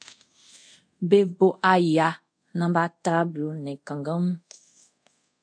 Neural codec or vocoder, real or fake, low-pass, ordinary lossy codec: codec, 24 kHz, 0.5 kbps, DualCodec; fake; 9.9 kHz; AAC, 64 kbps